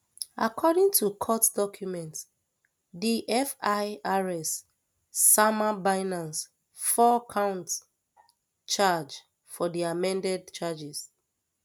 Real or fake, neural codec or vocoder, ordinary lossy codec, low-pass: real; none; none; none